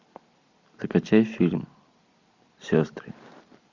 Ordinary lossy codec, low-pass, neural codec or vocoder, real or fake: MP3, 64 kbps; 7.2 kHz; none; real